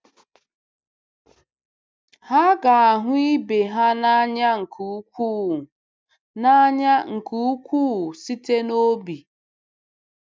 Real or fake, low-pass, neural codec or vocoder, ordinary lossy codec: real; none; none; none